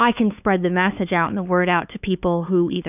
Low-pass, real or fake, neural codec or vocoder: 3.6 kHz; fake; codec, 16 kHz, 4 kbps, X-Codec, HuBERT features, trained on LibriSpeech